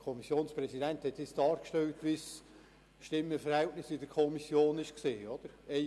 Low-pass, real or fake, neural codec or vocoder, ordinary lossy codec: none; real; none; none